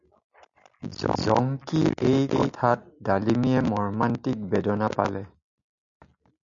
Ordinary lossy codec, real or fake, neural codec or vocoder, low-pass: MP3, 64 kbps; real; none; 7.2 kHz